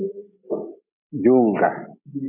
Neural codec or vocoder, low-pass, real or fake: none; 3.6 kHz; real